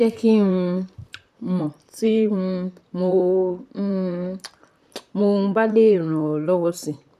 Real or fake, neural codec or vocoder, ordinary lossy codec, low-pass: fake; vocoder, 44.1 kHz, 128 mel bands, Pupu-Vocoder; none; 14.4 kHz